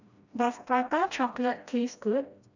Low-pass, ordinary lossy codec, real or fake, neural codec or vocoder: 7.2 kHz; none; fake; codec, 16 kHz, 1 kbps, FreqCodec, smaller model